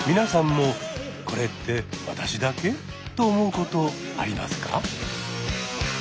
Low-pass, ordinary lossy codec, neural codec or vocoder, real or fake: none; none; none; real